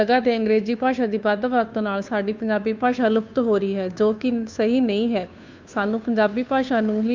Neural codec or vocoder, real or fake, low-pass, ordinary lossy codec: codec, 16 kHz, 2 kbps, FunCodec, trained on Chinese and English, 25 frames a second; fake; 7.2 kHz; MP3, 64 kbps